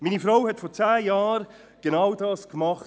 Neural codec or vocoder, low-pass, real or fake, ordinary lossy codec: none; none; real; none